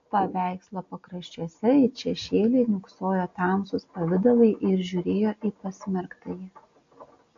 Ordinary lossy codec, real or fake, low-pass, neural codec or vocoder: AAC, 48 kbps; real; 7.2 kHz; none